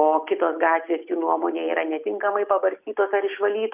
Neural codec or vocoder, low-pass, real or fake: none; 3.6 kHz; real